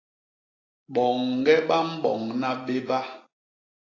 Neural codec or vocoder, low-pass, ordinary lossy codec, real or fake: none; 7.2 kHz; AAC, 32 kbps; real